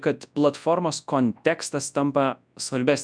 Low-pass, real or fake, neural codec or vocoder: 9.9 kHz; fake; codec, 24 kHz, 0.9 kbps, WavTokenizer, large speech release